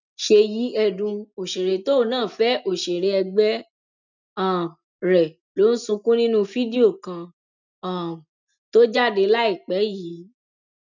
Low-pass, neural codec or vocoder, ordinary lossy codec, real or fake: 7.2 kHz; none; none; real